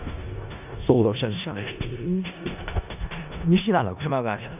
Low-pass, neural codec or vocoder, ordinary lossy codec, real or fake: 3.6 kHz; codec, 16 kHz in and 24 kHz out, 0.4 kbps, LongCat-Audio-Codec, four codebook decoder; none; fake